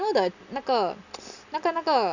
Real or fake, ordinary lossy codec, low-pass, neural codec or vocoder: real; none; 7.2 kHz; none